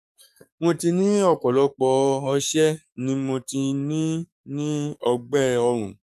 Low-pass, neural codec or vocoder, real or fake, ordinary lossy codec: 14.4 kHz; codec, 44.1 kHz, 7.8 kbps, DAC; fake; none